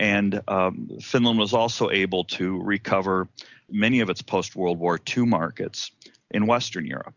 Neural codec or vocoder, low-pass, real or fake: none; 7.2 kHz; real